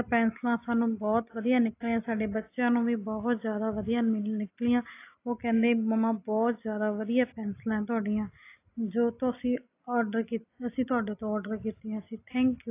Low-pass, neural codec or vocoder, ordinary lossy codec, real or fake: 3.6 kHz; none; AAC, 24 kbps; real